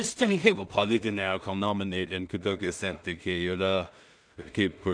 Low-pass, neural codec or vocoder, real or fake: 9.9 kHz; codec, 16 kHz in and 24 kHz out, 0.4 kbps, LongCat-Audio-Codec, two codebook decoder; fake